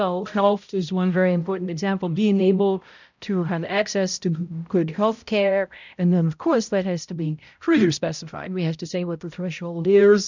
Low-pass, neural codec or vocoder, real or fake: 7.2 kHz; codec, 16 kHz, 0.5 kbps, X-Codec, HuBERT features, trained on balanced general audio; fake